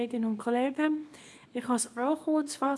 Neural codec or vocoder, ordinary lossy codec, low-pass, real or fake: codec, 24 kHz, 0.9 kbps, WavTokenizer, small release; none; none; fake